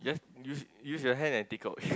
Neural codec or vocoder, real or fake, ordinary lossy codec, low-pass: none; real; none; none